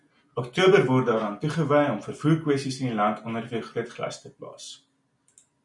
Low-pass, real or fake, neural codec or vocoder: 10.8 kHz; real; none